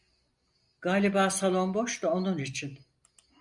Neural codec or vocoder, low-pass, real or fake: none; 10.8 kHz; real